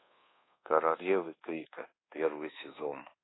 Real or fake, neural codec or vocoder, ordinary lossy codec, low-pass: fake; codec, 24 kHz, 1.2 kbps, DualCodec; AAC, 16 kbps; 7.2 kHz